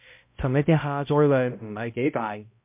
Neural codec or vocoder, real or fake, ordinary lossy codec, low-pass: codec, 16 kHz, 0.5 kbps, X-Codec, HuBERT features, trained on balanced general audio; fake; MP3, 32 kbps; 3.6 kHz